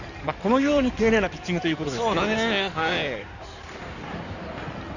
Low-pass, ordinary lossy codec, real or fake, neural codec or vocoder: 7.2 kHz; none; fake; codec, 16 kHz in and 24 kHz out, 2.2 kbps, FireRedTTS-2 codec